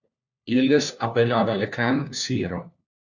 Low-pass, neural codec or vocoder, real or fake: 7.2 kHz; codec, 16 kHz, 1 kbps, FunCodec, trained on LibriTTS, 50 frames a second; fake